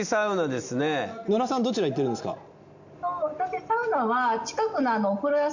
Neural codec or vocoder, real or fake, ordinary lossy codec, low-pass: none; real; none; 7.2 kHz